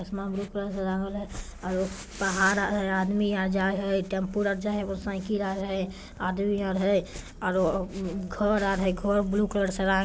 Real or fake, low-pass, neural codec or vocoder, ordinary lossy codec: real; none; none; none